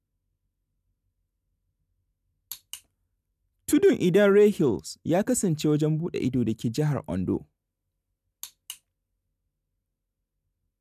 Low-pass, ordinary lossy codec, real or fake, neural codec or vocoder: 14.4 kHz; none; real; none